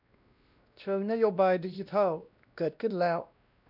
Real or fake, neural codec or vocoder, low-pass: fake; codec, 16 kHz, 1 kbps, X-Codec, WavLM features, trained on Multilingual LibriSpeech; 5.4 kHz